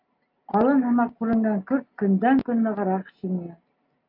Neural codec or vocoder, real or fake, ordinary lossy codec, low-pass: none; real; AAC, 48 kbps; 5.4 kHz